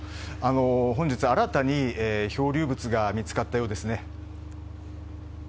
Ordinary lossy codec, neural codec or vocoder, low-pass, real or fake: none; none; none; real